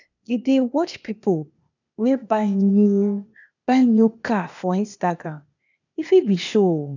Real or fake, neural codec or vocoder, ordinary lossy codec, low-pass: fake; codec, 16 kHz, 0.8 kbps, ZipCodec; none; 7.2 kHz